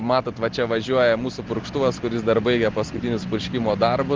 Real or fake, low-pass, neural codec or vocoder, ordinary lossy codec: real; 7.2 kHz; none; Opus, 16 kbps